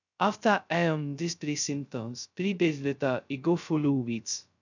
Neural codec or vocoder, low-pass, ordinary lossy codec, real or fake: codec, 16 kHz, 0.2 kbps, FocalCodec; 7.2 kHz; none; fake